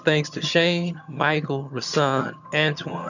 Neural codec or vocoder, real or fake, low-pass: vocoder, 22.05 kHz, 80 mel bands, HiFi-GAN; fake; 7.2 kHz